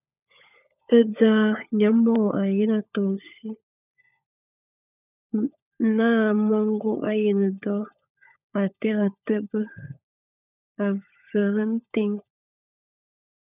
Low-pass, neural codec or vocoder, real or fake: 3.6 kHz; codec, 16 kHz, 16 kbps, FunCodec, trained on LibriTTS, 50 frames a second; fake